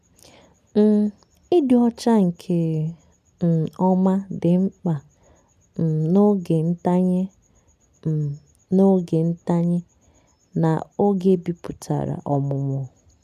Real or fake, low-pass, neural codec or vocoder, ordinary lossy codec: real; 14.4 kHz; none; none